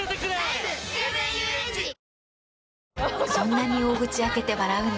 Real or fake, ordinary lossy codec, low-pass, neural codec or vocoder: real; none; none; none